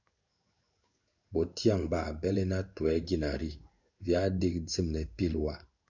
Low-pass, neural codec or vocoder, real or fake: 7.2 kHz; codec, 16 kHz in and 24 kHz out, 1 kbps, XY-Tokenizer; fake